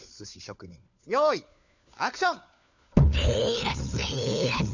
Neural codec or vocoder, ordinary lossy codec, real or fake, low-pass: codec, 16 kHz, 4 kbps, FunCodec, trained on LibriTTS, 50 frames a second; none; fake; 7.2 kHz